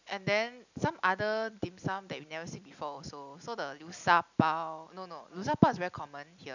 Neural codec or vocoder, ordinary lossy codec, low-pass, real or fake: none; none; 7.2 kHz; real